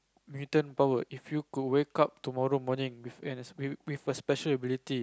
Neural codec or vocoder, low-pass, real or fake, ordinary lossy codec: none; none; real; none